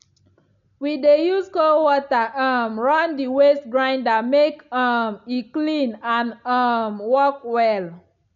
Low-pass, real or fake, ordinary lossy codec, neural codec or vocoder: 7.2 kHz; real; none; none